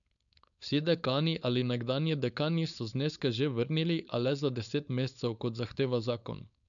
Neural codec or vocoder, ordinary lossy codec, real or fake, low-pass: codec, 16 kHz, 4.8 kbps, FACodec; none; fake; 7.2 kHz